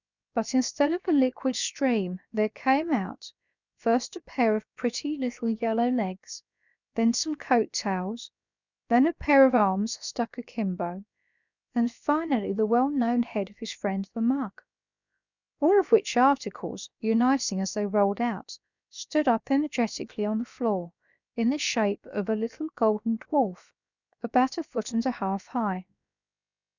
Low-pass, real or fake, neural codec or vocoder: 7.2 kHz; fake; codec, 16 kHz, about 1 kbps, DyCAST, with the encoder's durations